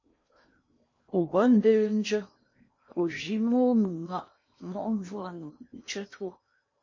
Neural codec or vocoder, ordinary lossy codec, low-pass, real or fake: codec, 16 kHz in and 24 kHz out, 0.8 kbps, FocalCodec, streaming, 65536 codes; MP3, 32 kbps; 7.2 kHz; fake